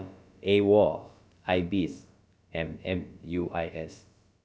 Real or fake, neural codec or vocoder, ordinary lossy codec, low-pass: fake; codec, 16 kHz, about 1 kbps, DyCAST, with the encoder's durations; none; none